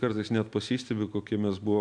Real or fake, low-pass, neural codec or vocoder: real; 9.9 kHz; none